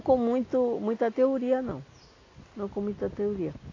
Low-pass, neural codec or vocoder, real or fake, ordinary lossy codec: 7.2 kHz; none; real; AAC, 32 kbps